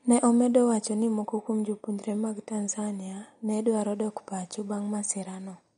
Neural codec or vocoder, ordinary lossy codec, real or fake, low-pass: none; MP3, 48 kbps; real; 9.9 kHz